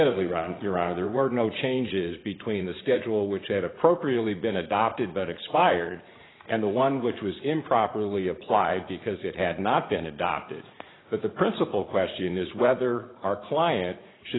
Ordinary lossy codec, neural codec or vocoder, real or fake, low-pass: AAC, 16 kbps; vocoder, 44.1 kHz, 80 mel bands, Vocos; fake; 7.2 kHz